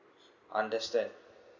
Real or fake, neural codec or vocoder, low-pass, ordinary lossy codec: real; none; 7.2 kHz; none